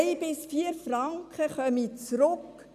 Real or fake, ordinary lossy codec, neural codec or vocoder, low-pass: real; none; none; 14.4 kHz